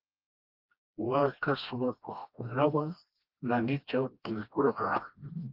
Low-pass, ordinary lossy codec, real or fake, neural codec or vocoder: 5.4 kHz; Opus, 24 kbps; fake; codec, 16 kHz, 1 kbps, FreqCodec, smaller model